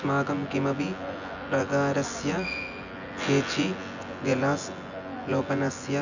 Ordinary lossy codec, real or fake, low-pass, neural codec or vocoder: none; fake; 7.2 kHz; vocoder, 24 kHz, 100 mel bands, Vocos